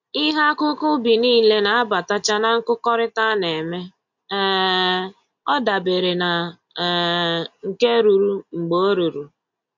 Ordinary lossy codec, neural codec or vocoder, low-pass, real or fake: MP3, 48 kbps; none; 7.2 kHz; real